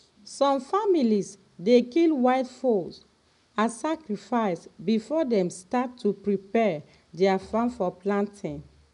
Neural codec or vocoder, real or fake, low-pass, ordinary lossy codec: none; real; 10.8 kHz; none